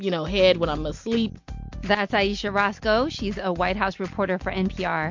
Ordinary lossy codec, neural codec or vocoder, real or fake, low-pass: MP3, 48 kbps; none; real; 7.2 kHz